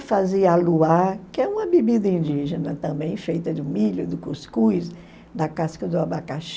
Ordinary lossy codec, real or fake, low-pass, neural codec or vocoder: none; real; none; none